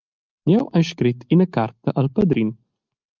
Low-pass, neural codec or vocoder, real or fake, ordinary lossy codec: 7.2 kHz; none; real; Opus, 32 kbps